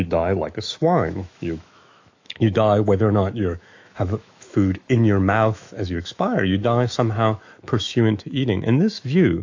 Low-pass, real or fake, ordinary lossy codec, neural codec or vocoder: 7.2 kHz; fake; AAC, 48 kbps; autoencoder, 48 kHz, 128 numbers a frame, DAC-VAE, trained on Japanese speech